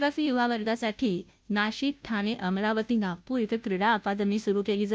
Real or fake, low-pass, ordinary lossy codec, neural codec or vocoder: fake; none; none; codec, 16 kHz, 0.5 kbps, FunCodec, trained on Chinese and English, 25 frames a second